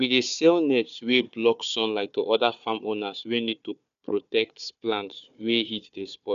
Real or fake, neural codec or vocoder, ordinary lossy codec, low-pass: fake; codec, 16 kHz, 4 kbps, FunCodec, trained on Chinese and English, 50 frames a second; none; 7.2 kHz